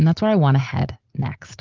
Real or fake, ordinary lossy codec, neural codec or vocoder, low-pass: real; Opus, 16 kbps; none; 7.2 kHz